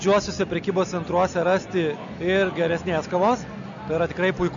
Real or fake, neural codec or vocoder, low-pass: real; none; 7.2 kHz